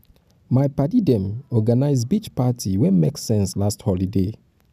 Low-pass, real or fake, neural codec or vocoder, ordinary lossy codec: 14.4 kHz; real; none; none